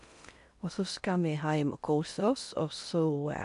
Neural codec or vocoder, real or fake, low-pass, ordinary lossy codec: codec, 16 kHz in and 24 kHz out, 0.8 kbps, FocalCodec, streaming, 65536 codes; fake; 10.8 kHz; none